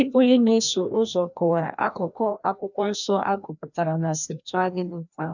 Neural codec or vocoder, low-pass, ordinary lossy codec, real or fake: codec, 16 kHz, 1 kbps, FreqCodec, larger model; 7.2 kHz; none; fake